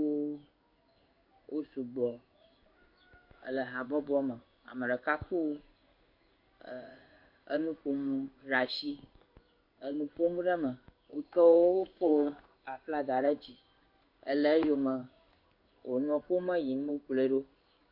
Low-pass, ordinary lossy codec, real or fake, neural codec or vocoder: 5.4 kHz; MP3, 32 kbps; fake; codec, 16 kHz in and 24 kHz out, 1 kbps, XY-Tokenizer